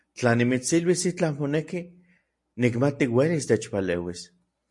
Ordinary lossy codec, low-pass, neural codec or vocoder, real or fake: MP3, 48 kbps; 10.8 kHz; none; real